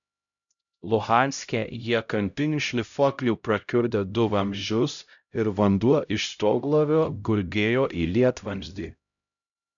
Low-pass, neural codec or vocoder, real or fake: 7.2 kHz; codec, 16 kHz, 0.5 kbps, X-Codec, HuBERT features, trained on LibriSpeech; fake